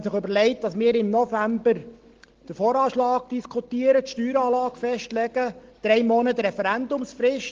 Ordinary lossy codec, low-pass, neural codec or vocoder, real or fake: Opus, 16 kbps; 7.2 kHz; none; real